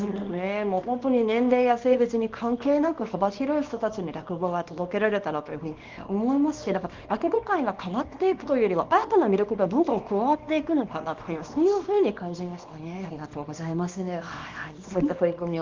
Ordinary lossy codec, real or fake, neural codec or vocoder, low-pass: Opus, 24 kbps; fake; codec, 24 kHz, 0.9 kbps, WavTokenizer, small release; 7.2 kHz